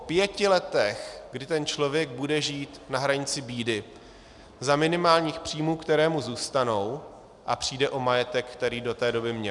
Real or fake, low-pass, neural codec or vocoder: real; 10.8 kHz; none